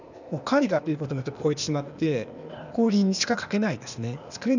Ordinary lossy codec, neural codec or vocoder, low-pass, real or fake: none; codec, 16 kHz, 0.8 kbps, ZipCodec; 7.2 kHz; fake